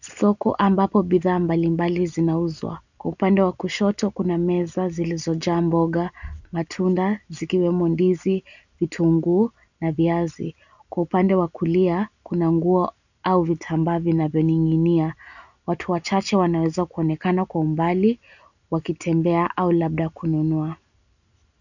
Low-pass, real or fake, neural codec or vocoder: 7.2 kHz; real; none